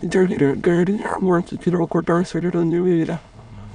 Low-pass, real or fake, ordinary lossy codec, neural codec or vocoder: 9.9 kHz; fake; MP3, 96 kbps; autoencoder, 22.05 kHz, a latent of 192 numbers a frame, VITS, trained on many speakers